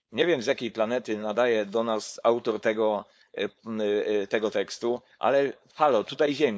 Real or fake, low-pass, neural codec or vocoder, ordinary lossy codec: fake; none; codec, 16 kHz, 4.8 kbps, FACodec; none